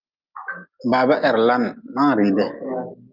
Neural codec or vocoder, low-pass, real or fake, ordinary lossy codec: none; 5.4 kHz; real; Opus, 24 kbps